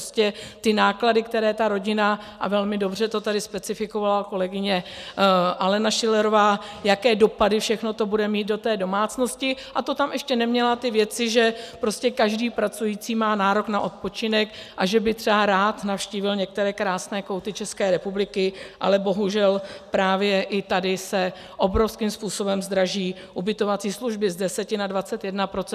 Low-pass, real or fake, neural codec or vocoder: 14.4 kHz; real; none